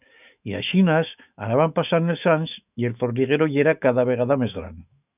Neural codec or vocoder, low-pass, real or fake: autoencoder, 48 kHz, 128 numbers a frame, DAC-VAE, trained on Japanese speech; 3.6 kHz; fake